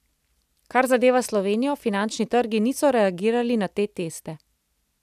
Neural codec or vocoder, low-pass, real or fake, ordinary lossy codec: none; 14.4 kHz; real; none